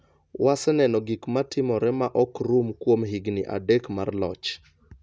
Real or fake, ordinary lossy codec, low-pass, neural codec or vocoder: real; none; none; none